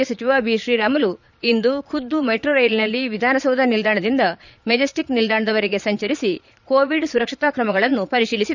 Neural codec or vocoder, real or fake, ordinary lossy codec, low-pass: vocoder, 22.05 kHz, 80 mel bands, Vocos; fake; none; 7.2 kHz